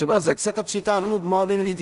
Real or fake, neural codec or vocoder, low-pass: fake; codec, 16 kHz in and 24 kHz out, 0.4 kbps, LongCat-Audio-Codec, two codebook decoder; 10.8 kHz